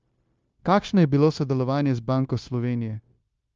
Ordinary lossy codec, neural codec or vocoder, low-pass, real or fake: Opus, 24 kbps; codec, 16 kHz, 0.9 kbps, LongCat-Audio-Codec; 7.2 kHz; fake